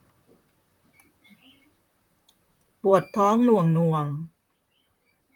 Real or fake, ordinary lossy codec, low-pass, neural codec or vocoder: fake; none; 19.8 kHz; vocoder, 44.1 kHz, 128 mel bands, Pupu-Vocoder